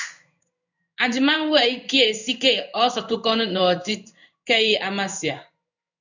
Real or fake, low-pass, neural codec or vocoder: fake; 7.2 kHz; codec, 16 kHz in and 24 kHz out, 1 kbps, XY-Tokenizer